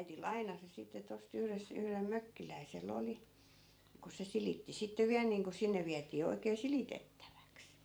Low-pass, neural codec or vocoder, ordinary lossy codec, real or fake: none; vocoder, 44.1 kHz, 128 mel bands every 512 samples, BigVGAN v2; none; fake